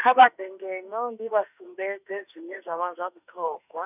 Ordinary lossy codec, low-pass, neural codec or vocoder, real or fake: none; 3.6 kHz; autoencoder, 48 kHz, 32 numbers a frame, DAC-VAE, trained on Japanese speech; fake